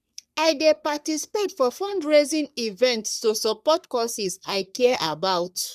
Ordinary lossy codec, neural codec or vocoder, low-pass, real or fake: none; codec, 44.1 kHz, 3.4 kbps, Pupu-Codec; 14.4 kHz; fake